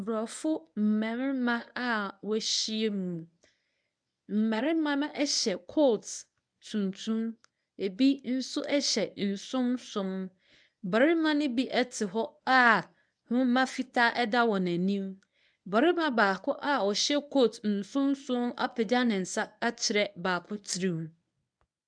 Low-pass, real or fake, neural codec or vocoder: 9.9 kHz; fake; codec, 24 kHz, 0.9 kbps, WavTokenizer, medium speech release version 1